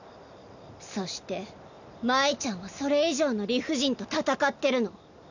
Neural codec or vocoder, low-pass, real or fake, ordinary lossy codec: none; 7.2 kHz; real; none